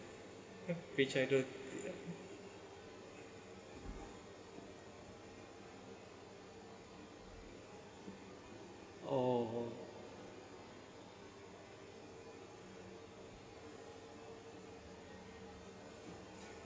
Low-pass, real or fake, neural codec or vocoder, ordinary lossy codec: none; real; none; none